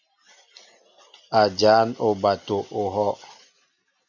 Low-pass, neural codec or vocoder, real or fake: 7.2 kHz; none; real